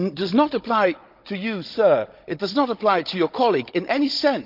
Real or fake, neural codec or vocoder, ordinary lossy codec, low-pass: fake; codec, 16 kHz, 16 kbps, FunCodec, trained on Chinese and English, 50 frames a second; Opus, 24 kbps; 5.4 kHz